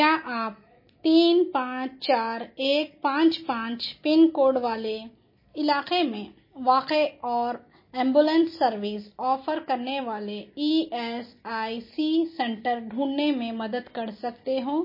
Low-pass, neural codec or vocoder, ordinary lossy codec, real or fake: 5.4 kHz; none; MP3, 24 kbps; real